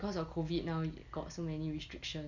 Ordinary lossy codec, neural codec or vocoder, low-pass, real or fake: none; none; 7.2 kHz; real